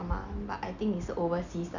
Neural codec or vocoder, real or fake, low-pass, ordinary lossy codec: none; real; 7.2 kHz; none